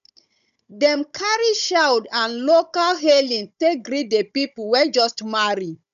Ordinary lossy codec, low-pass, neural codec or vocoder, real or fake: none; 7.2 kHz; codec, 16 kHz, 16 kbps, FunCodec, trained on Chinese and English, 50 frames a second; fake